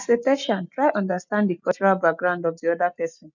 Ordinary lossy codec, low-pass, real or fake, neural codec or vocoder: none; 7.2 kHz; real; none